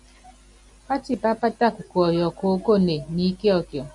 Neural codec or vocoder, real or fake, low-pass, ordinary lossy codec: none; real; 10.8 kHz; MP3, 96 kbps